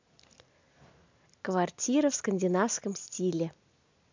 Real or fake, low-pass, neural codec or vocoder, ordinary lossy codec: real; 7.2 kHz; none; MP3, 64 kbps